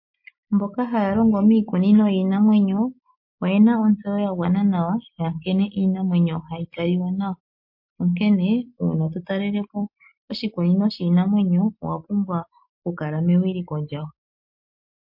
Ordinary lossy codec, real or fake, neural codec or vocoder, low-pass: MP3, 32 kbps; real; none; 5.4 kHz